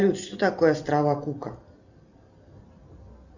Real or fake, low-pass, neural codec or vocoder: real; 7.2 kHz; none